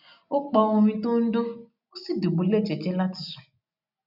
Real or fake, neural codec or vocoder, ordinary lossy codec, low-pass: real; none; none; 5.4 kHz